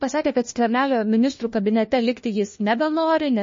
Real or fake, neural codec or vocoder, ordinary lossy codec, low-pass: fake; codec, 16 kHz, 1 kbps, FunCodec, trained on LibriTTS, 50 frames a second; MP3, 32 kbps; 7.2 kHz